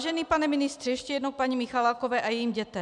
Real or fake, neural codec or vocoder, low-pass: real; none; 10.8 kHz